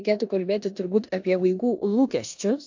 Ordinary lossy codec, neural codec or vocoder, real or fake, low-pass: AAC, 48 kbps; codec, 16 kHz in and 24 kHz out, 0.9 kbps, LongCat-Audio-Codec, four codebook decoder; fake; 7.2 kHz